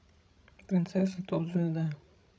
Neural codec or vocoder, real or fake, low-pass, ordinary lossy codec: codec, 16 kHz, 16 kbps, FreqCodec, larger model; fake; none; none